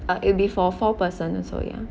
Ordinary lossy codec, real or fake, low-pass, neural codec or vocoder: none; real; none; none